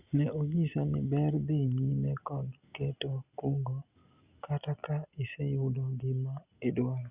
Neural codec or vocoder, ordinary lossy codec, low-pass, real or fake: none; Opus, 64 kbps; 3.6 kHz; real